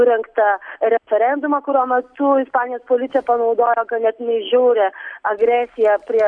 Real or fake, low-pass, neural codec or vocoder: real; 9.9 kHz; none